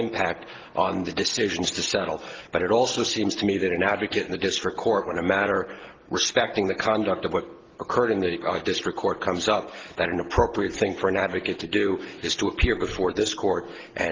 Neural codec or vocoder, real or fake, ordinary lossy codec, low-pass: none; real; Opus, 16 kbps; 7.2 kHz